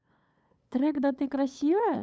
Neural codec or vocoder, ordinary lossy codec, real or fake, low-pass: codec, 16 kHz, 8 kbps, FunCodec, trained on LibriTTS, 25 frames a second; none; fake; none